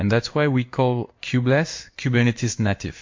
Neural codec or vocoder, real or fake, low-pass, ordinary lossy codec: codec, 16 kHz in and 24 kHz out, 1 kbps, XY-Tokenizer; fake; 7.2 kHz; MP3, 48 kbps